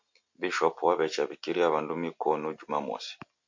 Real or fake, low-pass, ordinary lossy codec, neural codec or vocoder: real; 7.2 kHz; MP3, 48 kbps; none